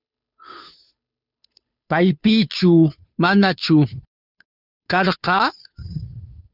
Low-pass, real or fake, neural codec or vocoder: 5.4 kHz; fake; codec, 16 kHz, 2 kbps, FunCodec, trained on Chinese and English, 25 frames a second